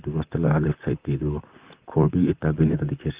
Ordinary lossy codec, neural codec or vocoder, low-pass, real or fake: Opus, 32 kbps; vocoder, 44.1 kHz, 128 mel bands, Pupu-Vocoder; 3.6 kHz; fake